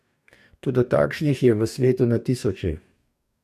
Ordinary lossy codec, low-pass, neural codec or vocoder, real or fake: none; 14.4 kHz; codec, 44.1 kHz, 2.6 kbps, DAC; fake